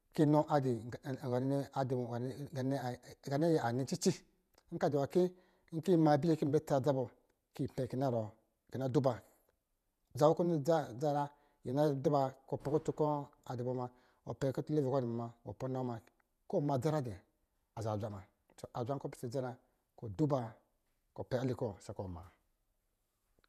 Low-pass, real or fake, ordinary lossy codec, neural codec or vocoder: 14.4 kHz; fake; none; vocoder, 48 kHz, 128 mel bands, Vocos